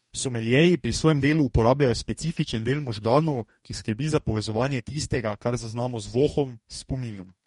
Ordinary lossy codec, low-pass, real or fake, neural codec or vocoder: MP3, 48 kbps; 19.8 kHz; fake; codec, 44.1 kHz, 2.6 kbps, DAC